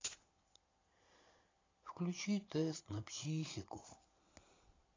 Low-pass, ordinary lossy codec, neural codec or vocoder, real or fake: 7.2 kHz; AAC, 32 kbps; vocoder, 22.05 kHz, 80 mel bands, Vocos; fake